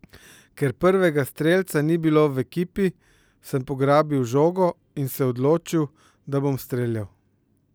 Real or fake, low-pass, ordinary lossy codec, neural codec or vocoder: real; none; none; none